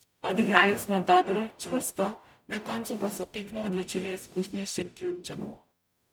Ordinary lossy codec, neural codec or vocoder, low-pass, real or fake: none; codec, 44.1 kHz, 0.9 kbps, DAC; none; fake